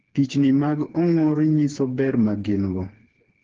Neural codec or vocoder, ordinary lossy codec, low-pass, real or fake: codec, 16 kHz, 4 kbps, FreqCodec, smaller model; Opus, 16 kbps; 7.2 kHz; fake